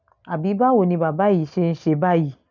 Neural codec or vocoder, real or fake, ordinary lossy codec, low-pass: none; real; none; 7.2 kHz